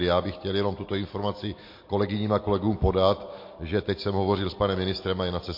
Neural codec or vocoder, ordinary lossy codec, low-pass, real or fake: none; MP3, 32 kbps; 5.4 kHz; real